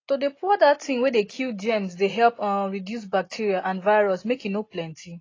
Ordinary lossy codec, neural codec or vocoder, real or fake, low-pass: AAC, 32 kbps; none; real; 7.2 kHz